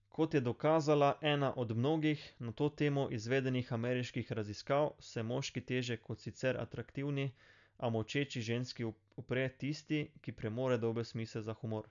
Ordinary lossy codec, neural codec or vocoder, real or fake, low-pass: none; none; real; 7.2 kHz